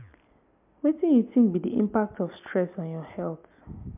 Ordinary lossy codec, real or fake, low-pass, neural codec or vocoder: MP3, 32 kbps; real; 3.6 kHz; none